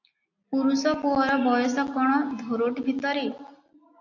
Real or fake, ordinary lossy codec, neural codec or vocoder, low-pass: real; AAC, 48 kbps; none; 7.2 kHz